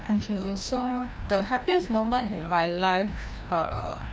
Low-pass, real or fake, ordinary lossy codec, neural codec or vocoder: none; fake; none; codec, 16 kHz, 1 kbps, FreqCodec, larger model